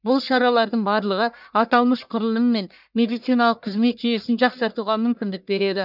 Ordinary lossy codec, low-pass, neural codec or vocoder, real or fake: none; 5.4 kHz; codec, 44.1 kHz, 1.7 kbps, Pupu-Codec; fake